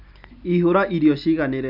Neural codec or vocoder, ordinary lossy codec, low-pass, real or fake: none; none; 5.4 kHz; real